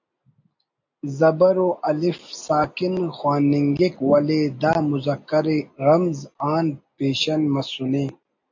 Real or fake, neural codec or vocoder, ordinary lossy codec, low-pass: real; none; AAC, 48 kbps; 7.2 kHz